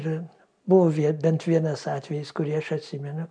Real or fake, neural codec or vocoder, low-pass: real; none; 9.9 kHz